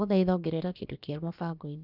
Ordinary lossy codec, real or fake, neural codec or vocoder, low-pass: none; fake; codec, 16 kHz, about 1 kbps, DyCAST, with the encoder's durations; 5.4 kHz